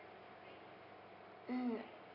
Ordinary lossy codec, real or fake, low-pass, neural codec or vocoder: none; real; 5.4 kHz; none